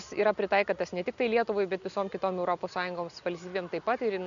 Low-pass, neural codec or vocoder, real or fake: 7.2 kHz; none; real